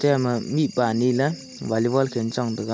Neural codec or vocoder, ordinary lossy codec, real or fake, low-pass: none; none; real; none